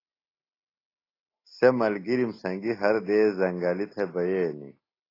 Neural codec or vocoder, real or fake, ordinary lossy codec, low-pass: none; real; AAC, 24 kbps; 5.4 kHz